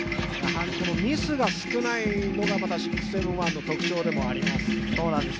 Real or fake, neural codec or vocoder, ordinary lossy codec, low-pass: real; none; none; none